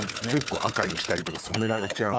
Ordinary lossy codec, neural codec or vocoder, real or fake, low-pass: none; codec, 16 kHz, 4 kbps, FunCodec, trained on Chinese and English, 50 frames a second; fake; none